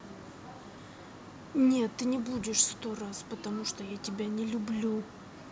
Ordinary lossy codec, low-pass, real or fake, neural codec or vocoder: none; none; real; none